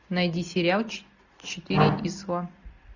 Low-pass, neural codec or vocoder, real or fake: 7.2 kHz; none; real